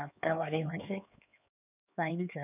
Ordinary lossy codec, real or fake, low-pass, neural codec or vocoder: none; fake; 3.6 kHz; codec, 16 kHz, 4 kbps, X-Codec, HuBERT features, trained on LibriSpeech